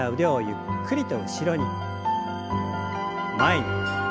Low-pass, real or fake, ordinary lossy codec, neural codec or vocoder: none; real; none; none